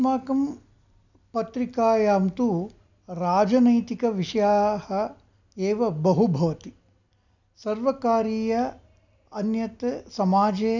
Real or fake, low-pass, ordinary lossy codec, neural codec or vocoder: real; 7.2 kHz; none; none